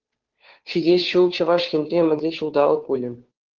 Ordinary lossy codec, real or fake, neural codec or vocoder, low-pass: Opus, 16 kbps; fake; codec, 16 kHz, 2 kbps, FunCodec, trained on Chinese and English, 25 frames a second; 7.2 kHz